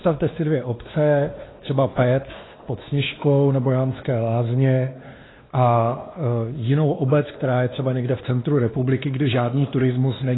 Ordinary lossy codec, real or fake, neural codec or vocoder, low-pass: AAC, 16 kbps; fake; codec, 16 kHz, 2 kbps, X-Codec, WavLM features, trained on Multilingual LibriSpeech; 7.2 kHz